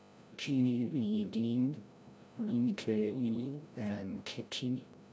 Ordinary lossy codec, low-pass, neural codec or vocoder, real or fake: none; none; codec, 16 kHz, 0.5 kbps, FreqCodec, larger model; fake